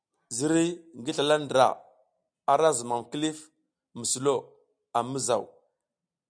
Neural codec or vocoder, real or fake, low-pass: none; real; 9.9 kHz